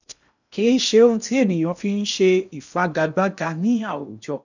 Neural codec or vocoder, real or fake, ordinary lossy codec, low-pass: codec, 16 kHz in and 24 kHz out, 0.8 kbps, FocalCodec, streaming, 65536 codes; fake; none; 7.2 kHz